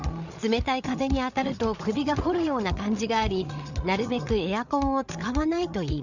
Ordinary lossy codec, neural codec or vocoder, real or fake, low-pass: none; codec, 16 kHz, 8 kbps, FreqCodec, larger model; fake; 7.2 kHz